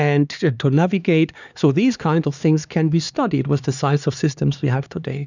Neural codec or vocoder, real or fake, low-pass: codec, 16 kHz, 2 kbps, X-Codec, HuBERT features, trained on LibriSpeech; fake; 7.2 kHz